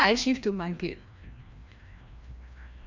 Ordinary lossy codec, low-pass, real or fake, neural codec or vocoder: MP3, 64 kbps; 7.2 kHz; fake; codec, 16 kHz, 1 kbps, FreqCodec, larger model